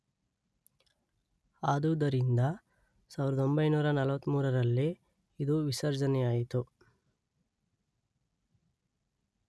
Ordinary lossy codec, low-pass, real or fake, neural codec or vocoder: none; none; real; none